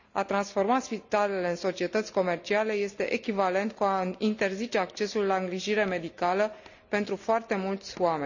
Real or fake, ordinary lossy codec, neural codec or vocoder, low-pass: real; none; none; 7.2 kHz